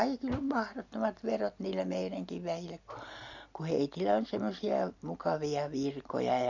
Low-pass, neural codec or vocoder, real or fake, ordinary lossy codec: 7.2 kHz; none; real; none